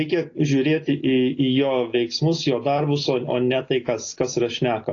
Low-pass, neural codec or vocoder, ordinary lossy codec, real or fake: 7.2 kHz; none; AAC, 32 kbps; real